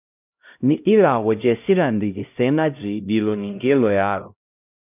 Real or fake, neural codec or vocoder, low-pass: fake; codec, 16 kHz, 0.5 kbps, X-Codec, HuBERT features, trained on LibriSpeech; 3.6 kHz